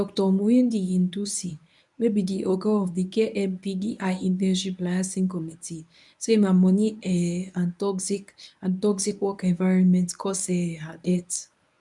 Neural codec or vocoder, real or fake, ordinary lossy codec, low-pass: codec, 24 kHz, 0.9 kbps, WavTokenizer, medium speech release version 1; fake; none; 10.8 kHz